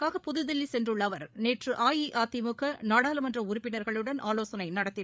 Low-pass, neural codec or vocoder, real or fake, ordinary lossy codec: none; codec, 16 kHz, 8 kbps, FreqCodec, larger model; fake; none